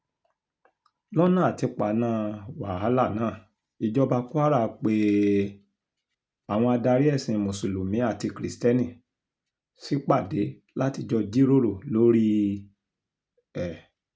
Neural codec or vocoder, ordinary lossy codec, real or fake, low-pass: none; none; real; none